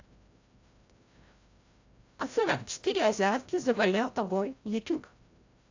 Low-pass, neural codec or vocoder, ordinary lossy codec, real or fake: 7.2 kHz; codec, 16 kHz, 0.5 kbps, FreqCodec, larger model; AAC, 48 kbps; fake